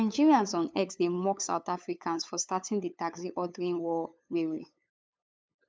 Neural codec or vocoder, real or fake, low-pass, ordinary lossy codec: codec, 16 kHz, 8 kbps, FunCodec, trained on LibriTTS, 25 frames a second; fake; none; none